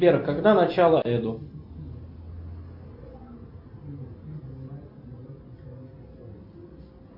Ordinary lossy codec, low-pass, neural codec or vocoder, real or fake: AAC, 48 kbps; 5.4 kHz; none; real